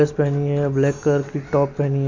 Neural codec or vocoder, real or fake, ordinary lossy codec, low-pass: none; real; none; 7.2 kHz